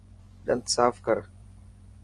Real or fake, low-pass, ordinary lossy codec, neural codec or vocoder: fake; 10.8 kHz; Opus, 32 kbps; vocoder, 24 kHz, 100 mel bands, Vocos